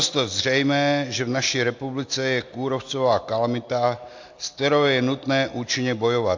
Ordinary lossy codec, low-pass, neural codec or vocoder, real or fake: AAC, 48 kbps; 7.2 kHz; none; real